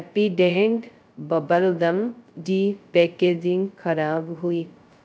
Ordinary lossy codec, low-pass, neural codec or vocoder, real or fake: none; none; codec, 16 kHz, 0.2 kbps, FocalCodec; fake